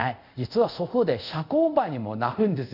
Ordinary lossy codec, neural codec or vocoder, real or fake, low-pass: none; codec, 24 kHz, 0.5 kbps, DualCodec; fake; 5.4 kHz